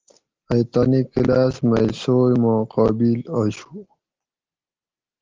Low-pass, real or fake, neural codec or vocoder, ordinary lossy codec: 7.2 kHz; real; none; Opus, 16 kbps